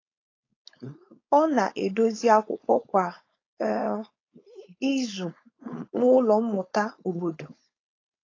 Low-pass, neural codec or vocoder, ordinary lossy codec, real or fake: 7.2 kHz; codec, 16 kHz, 4.8 kbps, FACodec; AAC, 32 kbps; fake